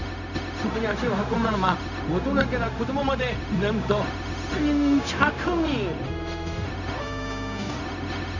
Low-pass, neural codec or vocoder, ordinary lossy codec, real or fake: 7.2 kHz; codec, 16 kHz, 0.4 kbps, LongCat-Audio-Codec; none; fake